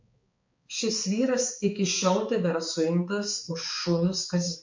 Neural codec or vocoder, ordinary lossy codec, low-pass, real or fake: codec, 16 kHz, 4 kbps, X-Codec, HuBERT features, trained on balanced general audio; MP3, 48 kbps; 7.2 kHz; fake